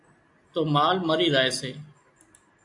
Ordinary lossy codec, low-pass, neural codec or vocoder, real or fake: AAC, 48 kbps; 10.8 kHz; none; real